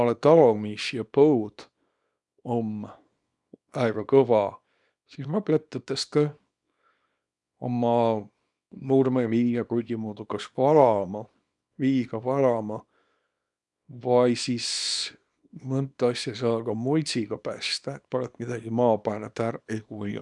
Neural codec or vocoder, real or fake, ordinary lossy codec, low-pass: codec, 24 kHz, 0.9 kbps, WavTokenizer, small release; fake; none; 10.8 kHz